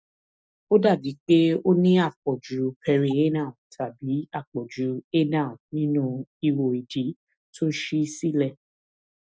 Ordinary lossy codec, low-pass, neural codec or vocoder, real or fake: none; none; none; real